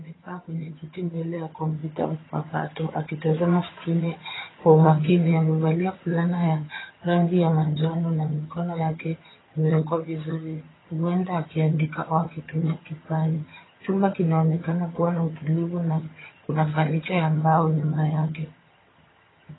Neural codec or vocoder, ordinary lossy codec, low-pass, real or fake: vocoder, 22.05 kHz, 80 mel bands, HiFi-GAN; AAC, 16 kbps; 7.2 kHz; fake